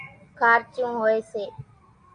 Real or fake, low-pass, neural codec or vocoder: real; 9.9 kHz; none